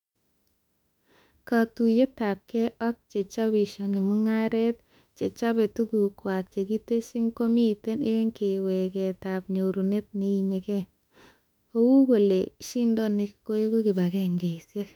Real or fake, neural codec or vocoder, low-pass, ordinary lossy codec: fake; autoencoder, 48 kHz, 32 numbers a frame, DAC-VAE, trained on Japanese speech; 19.8 kHz; none